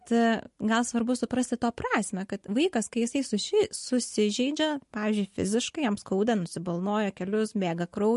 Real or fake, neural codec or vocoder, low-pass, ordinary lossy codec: real; none; 14.4 kHz; MP3, 48 kbps